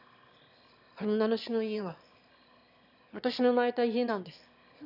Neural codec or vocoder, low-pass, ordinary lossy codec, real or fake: autoencoder, 22.05 kHz, a latent of 192 numbers a frame, VITS, trained on one speaker; 5.4 kHz; none; fake